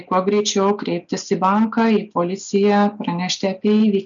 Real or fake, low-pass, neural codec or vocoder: real; 7.2 kHz; none